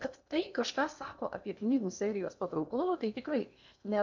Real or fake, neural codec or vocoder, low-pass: fake; codec, 16 kHz in and 24 kHz out, 0.8 kbps, FocalCodec, streaming, 65536 codes; 7.2 kHz